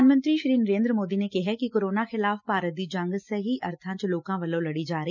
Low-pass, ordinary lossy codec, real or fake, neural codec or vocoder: 7.2 kHz; none; real; none